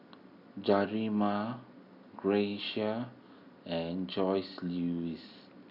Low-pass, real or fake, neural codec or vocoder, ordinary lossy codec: 5.4 kHz; real; none; none